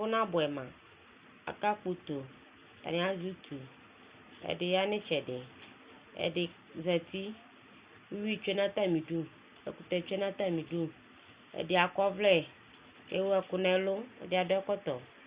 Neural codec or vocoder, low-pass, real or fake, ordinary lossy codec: none; 3.6 kHz; real; Opus, 64 kbps